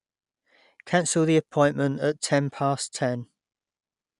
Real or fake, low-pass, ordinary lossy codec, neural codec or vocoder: real; 10.8 kHz; none; none